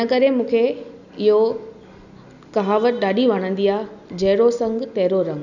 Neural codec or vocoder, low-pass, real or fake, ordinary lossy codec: none; 7.2 kHz; real; none